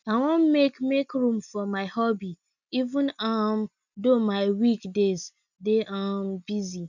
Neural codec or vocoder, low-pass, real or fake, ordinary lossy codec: none; 7.2 kHz; real; none